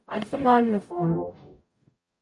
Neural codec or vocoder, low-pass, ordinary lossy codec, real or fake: codec, 44.1 kHz, 0.9 kbps, DAC; 10.8 kHz; MP3, 48 kbps; fake